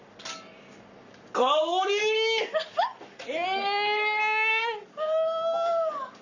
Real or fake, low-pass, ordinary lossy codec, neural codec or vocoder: fake; 7.2 kHz; none; codec, 44.1 kHz, 7.8 kbps, Pupu-Codec